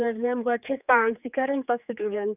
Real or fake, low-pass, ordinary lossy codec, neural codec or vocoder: fake; 3.6 kHz; none; codec, 16 kHz, 4 kbps, X-Codec, HuBERT features, trained on general audio